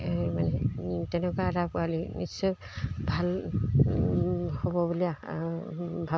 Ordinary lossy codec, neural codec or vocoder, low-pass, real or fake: none; none; none; real